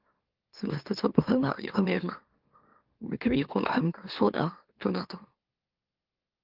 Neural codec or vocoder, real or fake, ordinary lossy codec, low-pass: autoencoder, 44.1 kHz, a latent of 192 numbers a frame, MeloTTS; fake; Opus, 32 kbps; 5.4 kHz